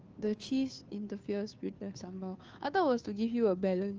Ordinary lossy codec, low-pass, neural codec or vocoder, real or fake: Opus, 24 kbps; 7.2 kHz; codec, 16 kHz, 2 kbps, FunCodec, trained on Chinese and English, 25 frames a second; fake